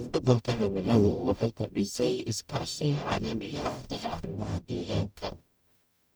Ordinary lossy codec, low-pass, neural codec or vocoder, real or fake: none; none; codec, 44.1 kHz, 0.9 kbps, DAC; fake